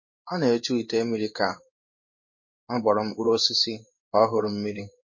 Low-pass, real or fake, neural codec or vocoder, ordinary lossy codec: 7.2 kHz; fake; codec, 16 kHz in and 24 kHz out, 1 kbps, XY-Tokenizer; MP3, 32 kbps